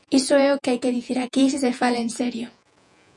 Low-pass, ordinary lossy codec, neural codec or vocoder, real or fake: 10.8 kHz; MP3, 96 kbps; vocoder, 48 kHz, 128 mel bands, Vocos; fake